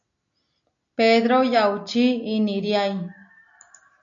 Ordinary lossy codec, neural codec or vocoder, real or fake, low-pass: MP3, 64 kbps; none; real; 7.2 kHz